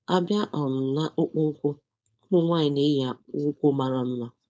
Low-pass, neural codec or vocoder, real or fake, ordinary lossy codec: none; codec, 16 kHz, 4.8 kbps, FACodec; fake; none